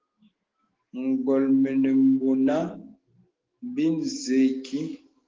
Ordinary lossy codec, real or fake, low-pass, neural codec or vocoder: Opus, 16 kbps; fake; 7.2 kHz; autoencoder, 48 kHz, 128 numbers a frame, DAC-VAE, trained on Japanese speech